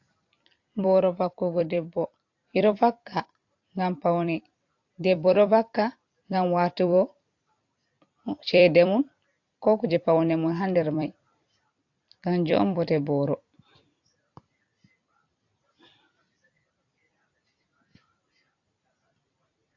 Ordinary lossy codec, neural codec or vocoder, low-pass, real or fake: AAC, 48 kbps; vocoder, 44.1 kHz, 128 mel bands every 256 samples, BigVGAN v2; 7.2 kHz; fake